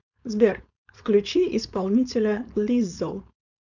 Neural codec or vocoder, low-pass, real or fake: codec, 16 kHz, 4.8 kbps, FACodec; 7.2 kHz; fake